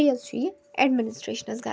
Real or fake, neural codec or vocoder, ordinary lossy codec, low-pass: real; none; none; none